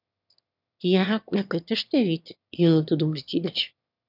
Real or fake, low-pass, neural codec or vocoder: fake; 5.4 kHz; autoencoder, 22.05 kHz, a latent of 192 numbers a frame, VITS, trained on one speaker